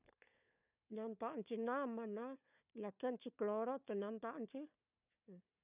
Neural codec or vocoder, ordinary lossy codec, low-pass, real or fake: codec, 16 kHz, 4 kbps, FunCodec, trained on Chinese and English, 50 frames a second; none; 3.6 kHz; fake